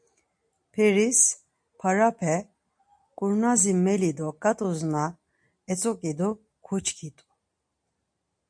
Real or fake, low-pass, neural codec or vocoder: real; 9.9 kHz; none